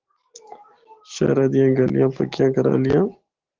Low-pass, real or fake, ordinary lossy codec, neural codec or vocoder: 7.2 kHz; real; Opus, 16 kbps; none